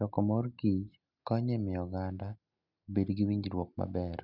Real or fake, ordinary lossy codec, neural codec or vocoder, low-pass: real; none; none; 5.4 kHz